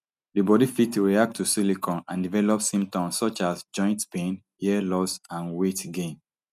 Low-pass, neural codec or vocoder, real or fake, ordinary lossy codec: 14.4 kHz; none; real; none